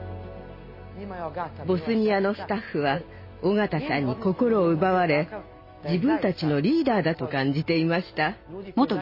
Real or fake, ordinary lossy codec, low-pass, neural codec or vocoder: real; MP3, 24 kbps; 5.4 kHz; none